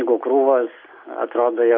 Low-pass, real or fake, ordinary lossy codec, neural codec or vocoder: 5.4 kHz; real; AAC, 48 kbps; none